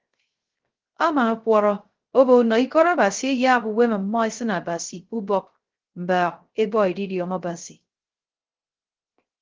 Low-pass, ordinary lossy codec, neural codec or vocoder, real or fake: 7.2 kHz; Opus, 16 kbps; codec, 16 kHz, 0.3 kbps, FocalCodec; fake